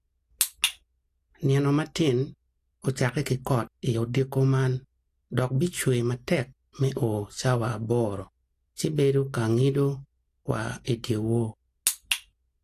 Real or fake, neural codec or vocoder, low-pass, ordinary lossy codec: real; none; 14.4 kHz; AAC, 64 kbps